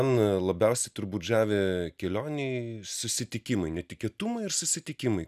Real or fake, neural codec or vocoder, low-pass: real; none; 14.4 kHz